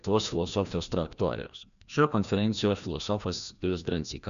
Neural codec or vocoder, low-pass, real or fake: codec, 16 kHz, 1 kbps, FreqCodec, larger model; 7.2 kHz; fake